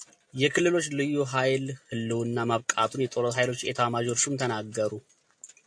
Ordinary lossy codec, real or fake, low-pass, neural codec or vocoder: AAC, 64 kbps; real; 9.9 kHz; none